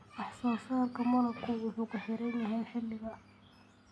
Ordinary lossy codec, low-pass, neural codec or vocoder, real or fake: none; none; none; real